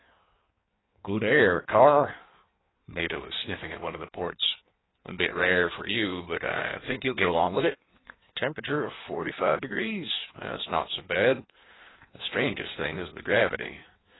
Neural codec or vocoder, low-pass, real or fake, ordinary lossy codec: codec, 16 kHz in and 24 kHz out, 1.1 kbps, FireRedTTS-2 codec; 7.2 kHz; fake; AAC, 16 kbps